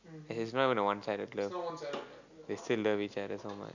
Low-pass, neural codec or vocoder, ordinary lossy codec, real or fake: 7.2 kHz; none; none; real